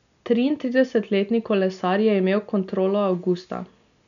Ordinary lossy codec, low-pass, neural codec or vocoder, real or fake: none; 7.2 kHz; none; real